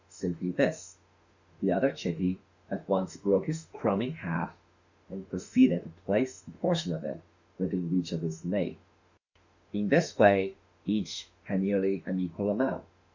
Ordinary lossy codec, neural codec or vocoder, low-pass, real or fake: Opus, 64 kbps; autoencoder, 48 kHz, 32 numbers a frame, DAC-VAE, trained on Japanese speech; 7.2 kHz; fake